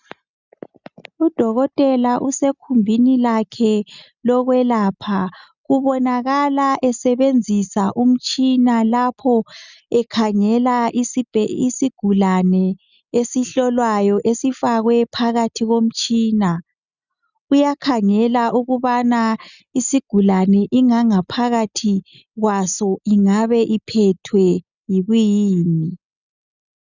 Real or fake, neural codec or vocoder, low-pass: real; none; 7.2 kHz